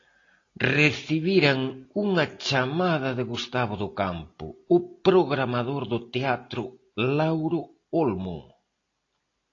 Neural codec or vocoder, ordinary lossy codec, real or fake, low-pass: none; AAC, 32 kbps; real; 7.2 kHz